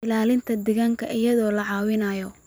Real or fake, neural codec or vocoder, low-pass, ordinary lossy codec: fake; vocoder, 44.1 kHz, 128 mel bands every 256 samples, BigVGAN v2; none; none